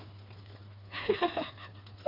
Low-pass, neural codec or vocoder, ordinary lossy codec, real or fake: 5.4 kHz; codec, 16 kHz, 4 kbps, FreqCodec, smaller model; MP3, 32 kbps; fake